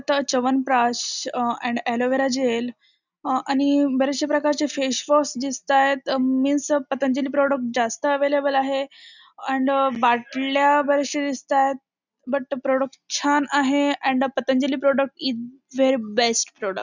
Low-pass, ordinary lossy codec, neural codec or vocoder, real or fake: 7.2 kHz; none; none; real